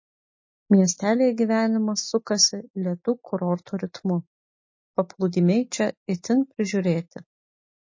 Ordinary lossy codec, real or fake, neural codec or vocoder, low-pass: MP3, 32 kbps; real; none; 7.2 kHz